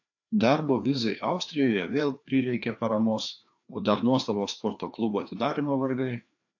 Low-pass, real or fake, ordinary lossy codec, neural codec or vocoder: 7.2 kHz; fake; AAC, 48 kbps; codec, 16 kHz, 2 kbps, FreqCodec, larger model